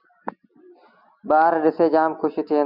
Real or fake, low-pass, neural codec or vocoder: real; 5.4 kHz; none